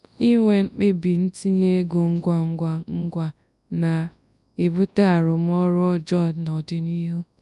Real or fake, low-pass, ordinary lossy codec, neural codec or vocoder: fake; 10.8 kHz; none; codec, 24 kHz, 0.9 kbps, WavTokenizer, large speech release